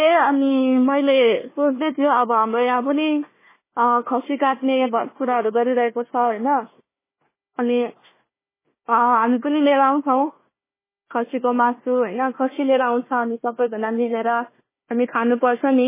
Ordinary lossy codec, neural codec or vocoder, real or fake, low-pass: MP3, 16 kbps; codec, 16 kHz, 1 kbps, FunCodec, trained on Chinese and English, 50 frames a second; fake; 3.6 kHz